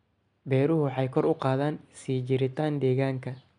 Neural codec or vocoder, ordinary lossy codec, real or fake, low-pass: none; none; real; 9.9 kHz